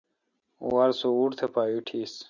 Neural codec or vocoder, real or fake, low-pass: none; real; 7.2 kHz